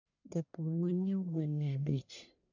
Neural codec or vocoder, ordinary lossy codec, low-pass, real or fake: codec, 44.1 kHz, 1.7 kbps, Pupu-Codec; none; 7.2 kHz; fake